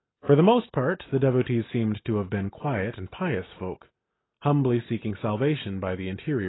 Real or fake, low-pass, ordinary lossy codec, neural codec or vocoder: real; 7.2 kHz; AAC, 16 kbps; none